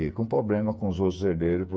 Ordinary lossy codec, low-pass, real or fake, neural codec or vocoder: none; none; fake; codec, 16 kHz, 8 kbps, FreqCodec, smaller model